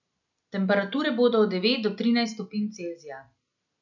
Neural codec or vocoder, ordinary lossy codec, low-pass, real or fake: none; none; 7.2 kHz; real